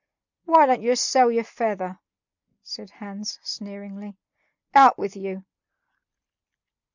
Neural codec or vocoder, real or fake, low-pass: none; real; 7.2 kHz